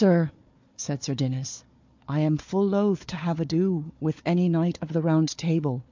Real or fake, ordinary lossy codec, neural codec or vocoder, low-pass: fake; MP3, 64 kbps; codec, 16 kHz, 4 kbps, FunCodec, trained on Chinese and English, 50 frames a second; 7.2 kHz